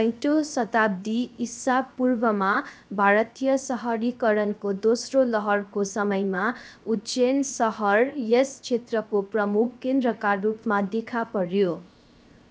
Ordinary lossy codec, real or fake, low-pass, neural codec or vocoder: none; fake; none; codec, 16 kHz, 0.7 kbps, FocalCodec